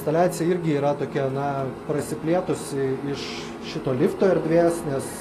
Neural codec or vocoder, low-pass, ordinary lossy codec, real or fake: none; 14.4 kHz; AAC, 48 kbps; real